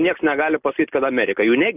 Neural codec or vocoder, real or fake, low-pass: none; real; 3.6 kHz